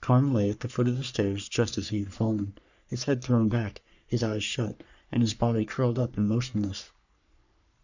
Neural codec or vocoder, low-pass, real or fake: codec, 44.1 kHz, 3.4 kbps, Pupu-Codec; 7.2 kHz; fake